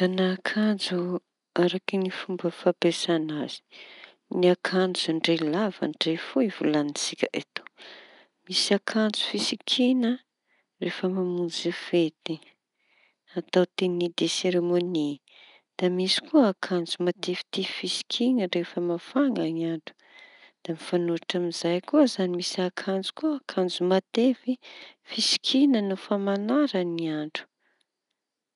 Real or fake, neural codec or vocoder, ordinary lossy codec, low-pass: real; none; none; 10.8 kHz